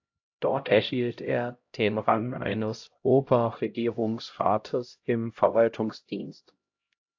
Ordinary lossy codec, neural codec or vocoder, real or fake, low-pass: AAC, 48 kbps; codec, 16 kHz, 0.5 kbps, X-Codec, HuBERT features, trained on LibriSpeech; fake; 7.2 kHz